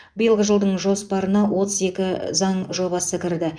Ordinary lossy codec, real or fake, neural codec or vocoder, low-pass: none; fake; vocoder, 24 kHz, 100 mel bands, Vocos; 9.9 kHz